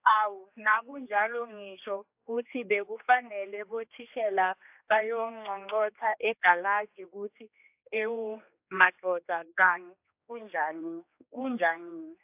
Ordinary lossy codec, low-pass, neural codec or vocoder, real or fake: AAC, 32 kbps; 3.6 kHz; codec, 16 kHz, 2 kbps, X-Codec, HuBERT features, trained on general audio; fake